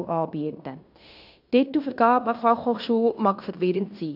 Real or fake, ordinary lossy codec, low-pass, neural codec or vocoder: fake; none; 5.4 kHz; codec, 16 kHz in and 24 kHz out, 0.9 kbps, LongCat-Audio-Codec, fine tuned four codebook decoder